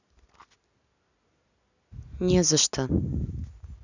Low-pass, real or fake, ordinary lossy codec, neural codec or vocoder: 7.2 kHz; real; none; none